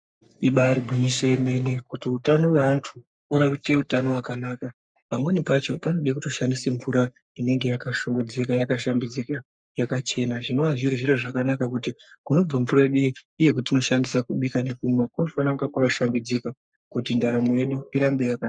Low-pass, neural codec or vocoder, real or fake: 9.9 kHz; codec, 44.1 kHz, 3.4 kbps, Pupu-Codec; fake